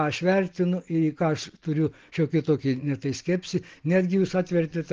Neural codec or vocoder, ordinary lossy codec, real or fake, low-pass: none; Opus, 16 kbps; real; 7.2 kHz